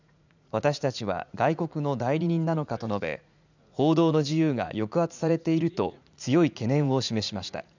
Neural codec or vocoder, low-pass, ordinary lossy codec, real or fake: vocoder, 44.1 kHz, 80 mel bands, Vocos; 7.2 kHz; none; fake